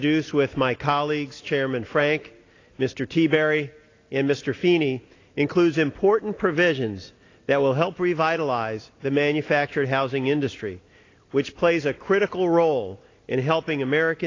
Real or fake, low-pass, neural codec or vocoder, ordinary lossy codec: real; 7.2 kHz; none; AAC, 32 kbps